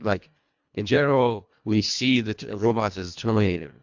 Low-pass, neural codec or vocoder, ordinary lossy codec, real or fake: 7.2 kHz; codec, 24 kHz, 1.5 kbps, HILCodec; MP3, 64 kbps; fake